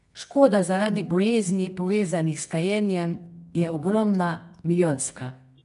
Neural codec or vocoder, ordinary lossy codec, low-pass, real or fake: codec, 24 kHz, 0.9 kbps, WavTokenizer, medium music audio release; none; 10.8 kHz; fake